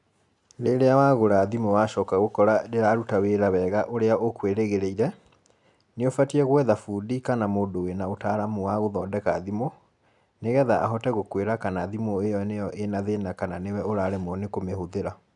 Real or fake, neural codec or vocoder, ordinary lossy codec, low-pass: real; none; none; 10.8 kHz